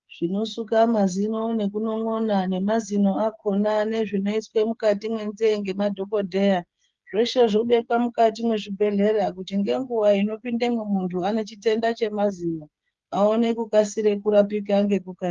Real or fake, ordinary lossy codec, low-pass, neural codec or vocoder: fake; Opus, 32 kbps; 7.2 kHz; codec, 16 kHz, 8 kbps, FreqCodec, smaller model